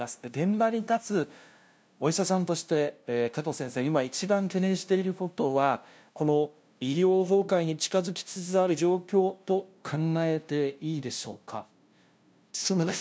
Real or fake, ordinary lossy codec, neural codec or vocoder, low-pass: fake; none; codec, 16 kHz, 0.5 kbps, FunCodec, trained on LibriTTS, 25 frames a second; none